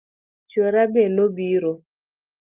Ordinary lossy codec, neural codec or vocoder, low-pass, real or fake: Opus, 32 kbps; none; 3.6 kHz; real